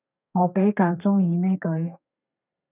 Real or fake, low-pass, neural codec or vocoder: fake; 3.6 kHz; codec, 32 kHz, 1.9 kbps, SNAC